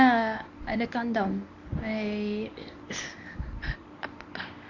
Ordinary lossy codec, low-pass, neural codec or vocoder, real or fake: none; 7.2 kHz; codec, 24 kHz, 0.9 kbps, WavTokenizer, medium speech release version 1; fake